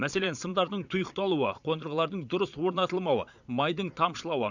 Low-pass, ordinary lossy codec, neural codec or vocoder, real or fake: 7.2 kHz; none; vocoder, 22.05 kHz, 80 mel bands, Vocos; fake